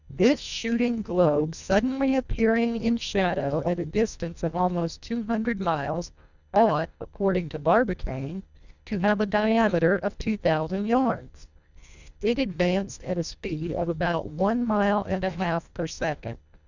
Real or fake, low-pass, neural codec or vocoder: fake; 7.2 kHz; codec, 24 kHz, 1.5 kbps, HILCodec